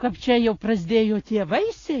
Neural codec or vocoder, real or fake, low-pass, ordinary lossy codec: none; real; 7.2 kHz; AAC, 32 kbps